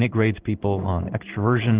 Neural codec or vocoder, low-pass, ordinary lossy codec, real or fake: codec, 16 kHz in and 24 kHz out, 1 kbps, XY-Tokenizer; 3.6 kHz; Opus, 16 kbps; fake